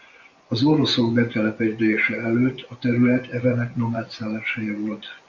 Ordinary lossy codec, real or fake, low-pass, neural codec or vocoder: MP3, 64 kbps; fake; 7.2 kHz; vocoder, 24 kHz, 100 mel bands, Vocos